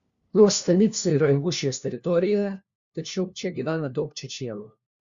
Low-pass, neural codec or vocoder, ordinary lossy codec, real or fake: 7.2 kHz; codec, 16 kHz, 1 kbps, FunCodec, trained on LibriTTS, 50 frames a second; Opus, 64 kbps; fake